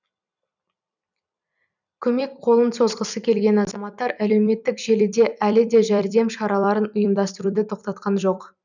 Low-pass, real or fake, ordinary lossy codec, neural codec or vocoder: 7.2 kHz; fake; none; vocoder, 44.1 kHz, 128 mel bands every 256 samples, BigVGAN v2